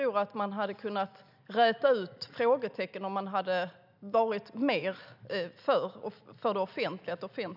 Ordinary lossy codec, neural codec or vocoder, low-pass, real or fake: none; none; 5.4 kHz; real